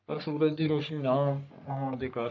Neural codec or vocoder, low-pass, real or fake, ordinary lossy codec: codec, 44.1 kHz, 3.4 kbps, Pupu-Codec; 7.2 kHz; fake; none